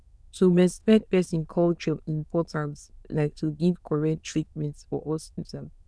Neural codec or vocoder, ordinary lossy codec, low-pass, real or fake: autoencoder, 22.05 kHz, a latent of 192 numbers a frame, VITS, trained on many speakers; none; none; fake